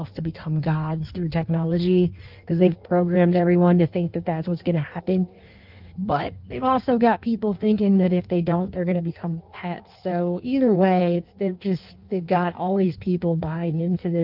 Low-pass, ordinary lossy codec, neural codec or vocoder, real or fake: 5.4 kHz; Opus, 32 kbps; codec, 16 kHz in and 24 kHz out, 1.1 kbps, FireRedTTS-2 codec; fake